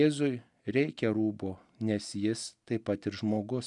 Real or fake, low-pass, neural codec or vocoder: real; 10.8 kHz; none